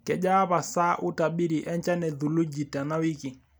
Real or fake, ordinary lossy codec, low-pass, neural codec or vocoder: real; none; none; none